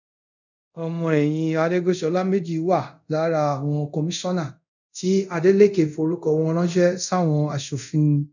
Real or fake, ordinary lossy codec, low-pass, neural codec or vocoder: fake; none; 7.2 kHz; codec, 24 kHz, 0.5 kbps, DualCodec